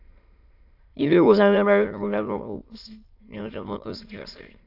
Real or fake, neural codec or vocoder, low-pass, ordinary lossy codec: fake; autoencoder, 22.05 kHz, a latent of 192 numbers a frame, VITS, trained on many speakers; 5.4 kHz; AAC, 32 kbps